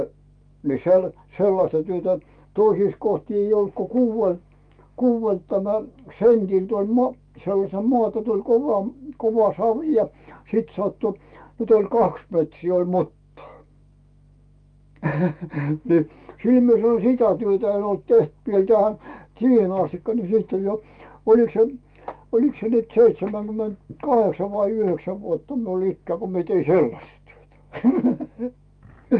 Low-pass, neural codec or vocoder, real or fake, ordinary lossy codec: 9.9 kHz; autoencoder, 48 kHz, 128 numbers a frame, DAC-VAE, trained on Japanese speech; fake; Opus, 32 kbps